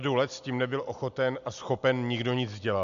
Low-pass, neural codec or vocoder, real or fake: 7.2 kHz; none; real